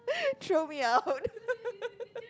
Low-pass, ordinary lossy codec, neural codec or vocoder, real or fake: none; none; none; real